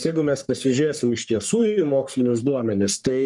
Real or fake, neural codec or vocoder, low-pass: fake; codec, 44.1 kHz, 3.4 kbps, Pupu-Codec; 10.8 kHz